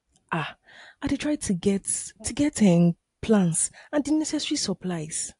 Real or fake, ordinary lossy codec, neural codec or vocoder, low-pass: real; AAC, 64 kbps; none; 10.8 kHz